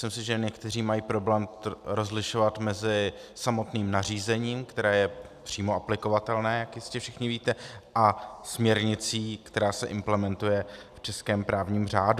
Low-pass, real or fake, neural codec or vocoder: 14.4 kHz; real; none